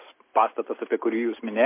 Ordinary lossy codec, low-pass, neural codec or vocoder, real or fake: MP3, 24 kbps; 3.6 kHz; none; real